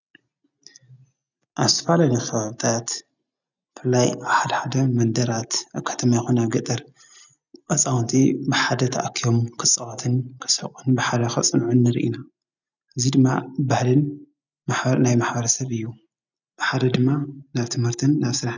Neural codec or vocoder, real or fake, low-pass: none; real; 7.2 kHz